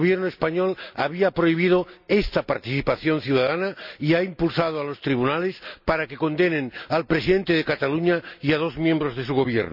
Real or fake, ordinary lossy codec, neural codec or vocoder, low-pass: real; none; none; 5.4 kHz